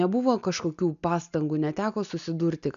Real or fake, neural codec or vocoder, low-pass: real; none; 7.2 kHz